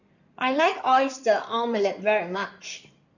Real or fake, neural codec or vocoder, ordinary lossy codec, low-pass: fake; codec, 16 kHz in and 24 kHz out, 2.2 kbps, FireRedTTS-2 codec; none; 7.2 kHz